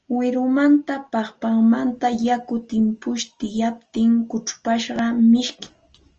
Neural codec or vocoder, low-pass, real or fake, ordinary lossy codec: none; 7.2 kHz; real; Opus, 24 kbps